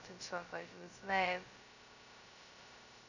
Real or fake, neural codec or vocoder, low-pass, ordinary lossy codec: fake; codec, 16 kHz, 0.2 kbps, FocalCodec; 7.2 kHz; none